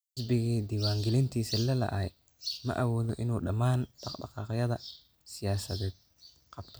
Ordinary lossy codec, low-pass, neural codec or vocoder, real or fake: none; none; none; real